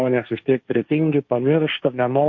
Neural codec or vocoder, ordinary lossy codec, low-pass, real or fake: codec, 16 kHz, 1.1 kbps, Voila-Tokenizer; MP3, 48 kbps; 7.2 kHz; fake